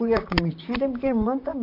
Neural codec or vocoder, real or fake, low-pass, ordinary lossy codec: vocoder, 44.1 kHz, 128 mel bands, Pupu-Vocoder; fake; 5.4 kHz; MP3, 48 kbps